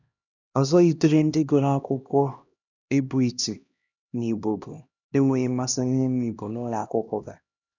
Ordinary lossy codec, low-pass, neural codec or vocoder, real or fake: none; 7.2 kHz; codec, 16 kHz, 1 kbps, X-Codec, HuBERT features, trained on LibriSpeech; fake